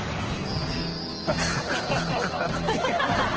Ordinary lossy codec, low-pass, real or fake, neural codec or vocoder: Opus, 16 kbps; 7.2 kHz; real; none